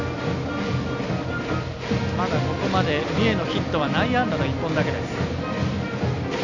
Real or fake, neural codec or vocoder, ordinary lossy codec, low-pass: real; none; none; 7.2 kHz